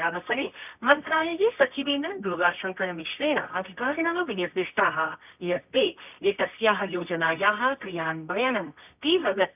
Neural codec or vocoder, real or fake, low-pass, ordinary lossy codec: codec, 24 kHz, 0.9 kbps, WavTokenizer, medium music audio release; fake; 3.6 kHz; none